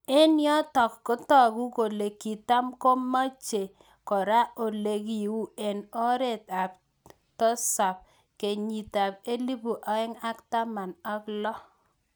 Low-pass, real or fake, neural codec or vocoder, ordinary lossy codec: none; real; none; none